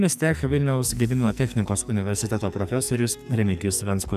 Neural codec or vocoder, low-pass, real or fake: codec, 44.1 kHz, 2.6 kbps, SNAC; 14.4 kHz; fake